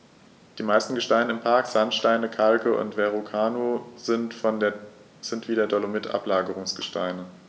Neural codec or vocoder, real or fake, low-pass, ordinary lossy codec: none; real; none; none